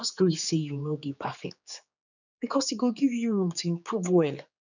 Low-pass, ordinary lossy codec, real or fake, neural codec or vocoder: 7.2 kHz; none; fake; codec, 16 kHz, 4 kbps, X-Codec, HuBERT features, trained on general audio